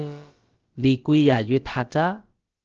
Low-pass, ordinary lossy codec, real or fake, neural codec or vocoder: 7.2 kHz; Opus, 16 kbps; fake; codec, 16 kHz, about 1 kbps, DyCAST, with the encoder's durations